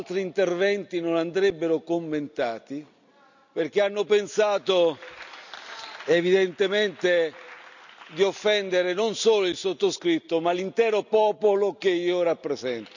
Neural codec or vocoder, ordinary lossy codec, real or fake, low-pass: none; none; real; 7.2 kHz